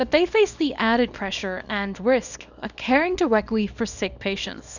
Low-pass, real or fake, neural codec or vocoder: 7.2 kHz; fake; codec, 24 kHz, 0.9 kbps, WavTokenizer, small release